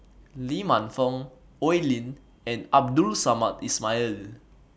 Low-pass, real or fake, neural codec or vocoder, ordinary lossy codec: none; real; none; none